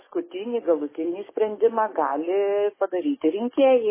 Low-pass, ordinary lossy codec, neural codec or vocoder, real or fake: 3.6 kHz; MP3, 16 kbps; codec, 44.1 kHz, 7.8 kbps, DAC; fake